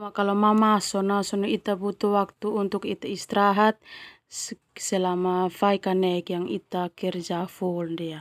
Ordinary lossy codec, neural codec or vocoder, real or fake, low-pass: none; none; real; 14.4 kHz